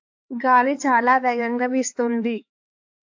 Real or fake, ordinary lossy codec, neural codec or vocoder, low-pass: fake; AAC, 48 kbps; codec, 16 kHz, 4 kbps, X-Codec, HuBERT features, trained on LibriSpeech; 7.2 kHz